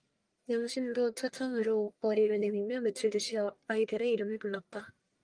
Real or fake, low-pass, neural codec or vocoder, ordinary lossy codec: fake; 9.9 kHz; codec, 44.1 kHz, 1.7 kbps, Pupu-Codec; Opus, 32 kbps